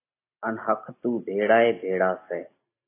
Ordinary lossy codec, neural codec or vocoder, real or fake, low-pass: AAC, 24 kbps; none; real; 3.6 kHz